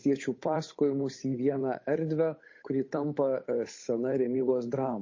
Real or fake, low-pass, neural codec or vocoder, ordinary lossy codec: fake; 7.2 kHz; codec, 16 kHz, 8 kbps, FunCodec, trained on Chinese and English, 25 frames a second; MP3, 32 kbps